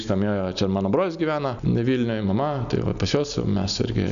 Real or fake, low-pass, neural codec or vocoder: real; 7.2 kHz; none